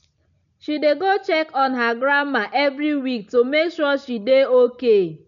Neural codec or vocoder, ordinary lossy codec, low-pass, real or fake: none; none; 7.2 kHz; real